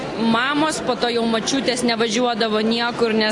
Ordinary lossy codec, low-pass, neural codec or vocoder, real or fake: AAC, 48 kbps; 10.8 kHz; none; real